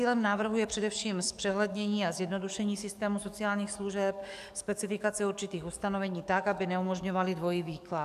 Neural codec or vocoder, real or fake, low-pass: codec, 44.1 kHz, 7.8 kbps, DAC; fake; 14.4 kHz